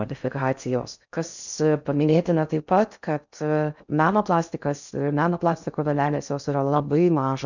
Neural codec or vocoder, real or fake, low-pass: codec, 16 kHz in and 24 kHz out, 0.6 kbps, FocalCodec, streaming, 4096 codes; fake; 7.2 kHz